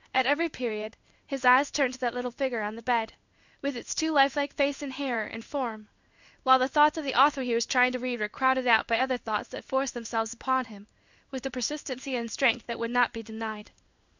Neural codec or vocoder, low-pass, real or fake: codec, 16 kHz in and 24 kHz out, 1 kbps, XY-Tokenizer; 7.2 kHz; fake